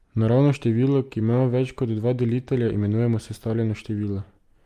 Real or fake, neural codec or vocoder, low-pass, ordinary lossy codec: real; none; 14.4 kHz; Opus, 32 kbps